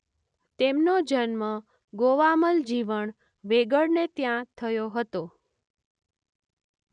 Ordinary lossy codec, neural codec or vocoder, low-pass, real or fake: none; none; 9.9 kHz; real